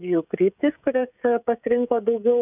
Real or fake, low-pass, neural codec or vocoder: fake; 3.6 kHz; codec, 16 kHz, 16 kbps, FreqCodec, smaller model